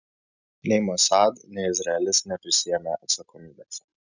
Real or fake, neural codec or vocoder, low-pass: real; none; 7.2 kHz